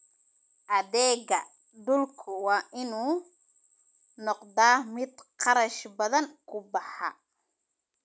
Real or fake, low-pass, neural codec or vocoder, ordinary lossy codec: real; none; none; none